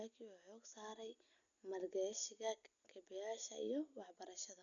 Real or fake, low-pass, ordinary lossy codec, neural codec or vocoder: real; 7.2 kHz; none; none